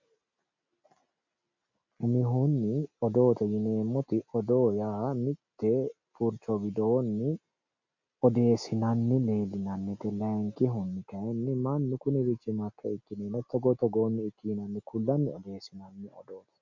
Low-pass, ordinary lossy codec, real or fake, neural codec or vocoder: 7.2 kHz; MP3, 48 kbps; real; none